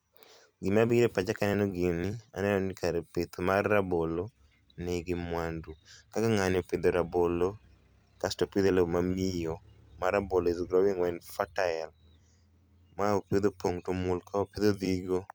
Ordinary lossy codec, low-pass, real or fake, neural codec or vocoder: none; none; fake; vocoder, 44.1 kHz, 128 mel bands every 256 samples, BigVGAN v2